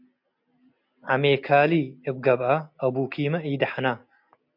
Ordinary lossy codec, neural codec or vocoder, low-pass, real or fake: MP3, 48 kbps; none; 5.4 kHz; real